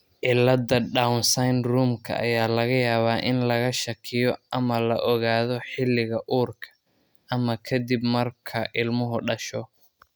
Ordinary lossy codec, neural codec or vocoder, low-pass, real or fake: none; none; none; real